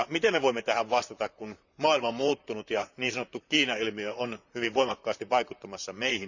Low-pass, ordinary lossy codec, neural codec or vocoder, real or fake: 7.2 kHz; none; vocoder, 44.1 kHz, 128 mel bands, Pupu-Vocoder; fake